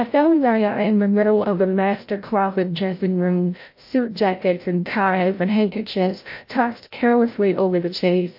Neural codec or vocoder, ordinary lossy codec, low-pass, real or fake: codec, 16 kHz, 0.5 kbps, FreqCodec, larger model; MP3, 32 kbps; 5.4 kHz; fake